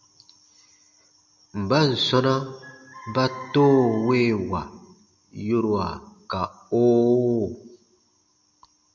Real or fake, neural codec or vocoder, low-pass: real; none; 7.2 kHz